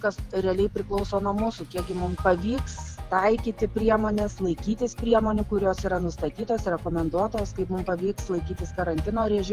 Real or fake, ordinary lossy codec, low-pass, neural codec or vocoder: fake; Opus, 32 kbps; 14.4 kHz; vocoder, 48 kHz, 128 mel bands, Vocos